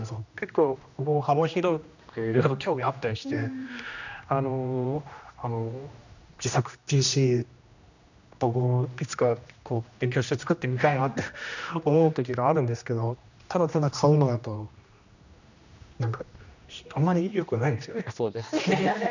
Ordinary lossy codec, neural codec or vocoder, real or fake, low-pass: none; codec, 16 kHz, 1 kbps, X-Codec, HuBERT features, trained on general audio; fake; 7.2 kHz